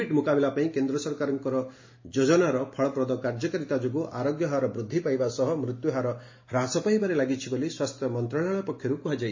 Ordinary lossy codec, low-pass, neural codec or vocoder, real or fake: MP3, 32 kbps; 7.2 kHz; none; real